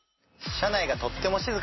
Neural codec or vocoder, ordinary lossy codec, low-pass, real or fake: none; MP3, 24 kbps; 7.2 kHz; real